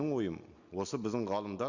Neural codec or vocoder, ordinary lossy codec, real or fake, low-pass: none; Opus, 64 kbps; real; 7.2 kHz